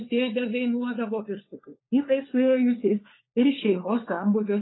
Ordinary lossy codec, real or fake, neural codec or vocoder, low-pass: AAC, 16 kbps; fake; codec, 16 kHz, 4 kbps, FunCodec, trained on LibriTTS, 50 frames a second; 7.2 kHz